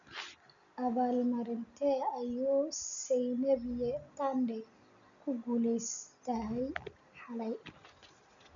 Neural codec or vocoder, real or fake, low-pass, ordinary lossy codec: none; real; 7.2 kHz; none